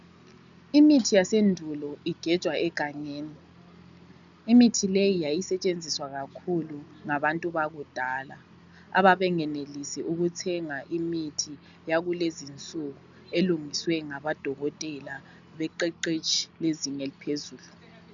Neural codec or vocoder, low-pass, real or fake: none; 7.2 kHz; real